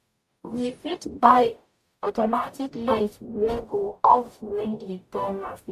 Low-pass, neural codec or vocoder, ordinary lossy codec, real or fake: 14.4 kHz; codec, 44.1 kHz, 0.9 kbps, DAC; AAC, 96 kbps; fake